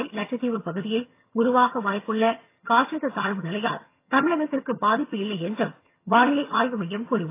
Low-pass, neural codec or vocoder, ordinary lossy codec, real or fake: 3.6 kHz; vocoder, 22.05 kHz, 80 mel bands, HiFi-GAN; AAC, 24 kbps; fake